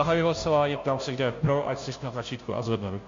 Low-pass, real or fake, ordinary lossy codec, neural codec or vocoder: 7.2 kHz; fake; MP3, 48 kbps; codec, 16 kHz, 0.5 kbps, FunCodec, trained on Chinese and English, 25 frames a second